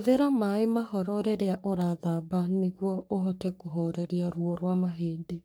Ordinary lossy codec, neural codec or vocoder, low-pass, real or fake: none; codec, 44.1 kHz, 3.4 kbps, Pupu-Codec; none; fake